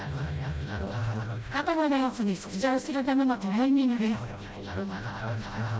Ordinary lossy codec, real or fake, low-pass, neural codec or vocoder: none; fake; none; codec, 16 kHz, 0.5 kbps, FreqCodec, smaller model